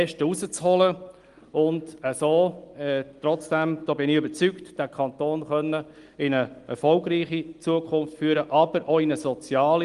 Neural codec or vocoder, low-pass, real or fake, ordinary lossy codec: none; 10.8 kHz; real; Opus, 24 kbps